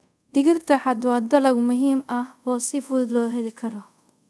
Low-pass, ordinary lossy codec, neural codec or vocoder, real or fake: none; none; codec, 24 kHz, 0.5 kbps, DualCodec; fake